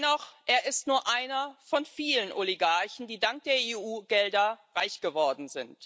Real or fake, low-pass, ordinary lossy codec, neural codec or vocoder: real; none; none; none